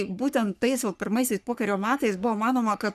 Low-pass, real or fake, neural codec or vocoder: 14.4 kHz; fake; codec, 44.1 kHz, 3.4 kbps, Pupu-Codec